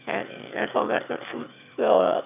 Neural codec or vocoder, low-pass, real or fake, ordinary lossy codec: autoencoder, 22.05 kHz, a latent of 192 numbers a frame, VITS, trained on one speaker; 3.6 kHz; fake; none